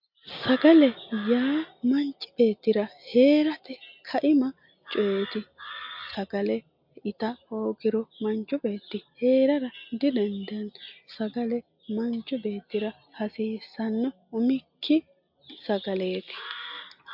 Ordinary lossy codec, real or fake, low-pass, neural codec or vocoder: MP3, 48 kbps; real; 5.4 kHz; none